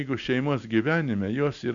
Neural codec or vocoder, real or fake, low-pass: none; real; 7.2 kHz